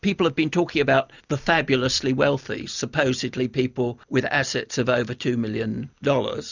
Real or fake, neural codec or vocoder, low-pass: real; none; 7.2 kHz